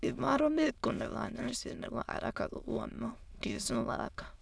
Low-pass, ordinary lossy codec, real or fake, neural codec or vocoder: none; none; fake; autoencoder, 22.05 kHz, a latent of 192 numbers a frame, VITS, trained on many speakers